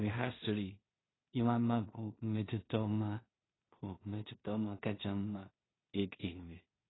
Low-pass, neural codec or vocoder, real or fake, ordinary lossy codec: 7.2 kHz; codec, 16 kHz in and 24 kHz out, 0.4 kbps, LongCat-Audio-Codec, two codebook decoder; fake; AAC, 16 kbps